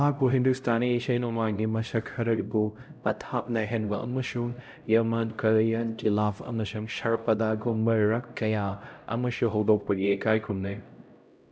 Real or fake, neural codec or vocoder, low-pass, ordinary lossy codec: fake; codec, 16 kHz, 0.5 kbps, X-Codec, HuBERT features, trained on LibriSpeech; none; none